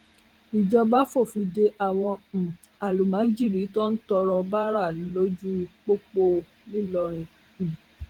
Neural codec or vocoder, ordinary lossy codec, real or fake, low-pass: vocoder, 44.1 kHz, 128 mel bands every 512 samples, BigVGAN v2; Opus, 24 kbps; fake; 19.8 kHz